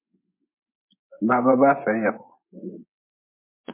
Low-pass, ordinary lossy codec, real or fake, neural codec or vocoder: 3.6 kHz; MP3, 32 kbps; fake; vocoder, 24 kHz, 100 mel bands, Vocos